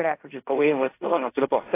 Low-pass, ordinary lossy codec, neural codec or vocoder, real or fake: 3.6 kHz; AAC, 24 kbps; codec, 16 kHz in and 24 kHz out, 0.4 kbps, LongCat-Audio-Codec, fine tuned four codebook decoder; fake